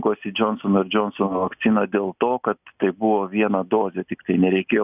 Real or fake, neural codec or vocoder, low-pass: real; none; 3.6 kHz